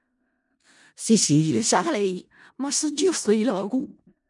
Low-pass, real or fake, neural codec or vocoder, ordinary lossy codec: 10.8 kHz; fake; codec, 16 kHz in and 24 kHz out, 0.4 kbps, LongCat-Audio-Codec, four codebook decoder; MP3, 96 kbps